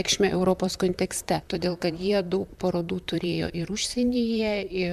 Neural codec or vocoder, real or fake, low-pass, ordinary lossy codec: vocoder, 44.1 kHz, 128 mel bands, Pupu-Vocoder; fake; 14.4 kHz; MP3, 96 kbps